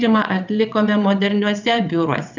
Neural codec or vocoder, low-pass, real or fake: vocoder, 22.05 kHz, 80 mel bands, WaveNeXt; 7.2 kHz; fake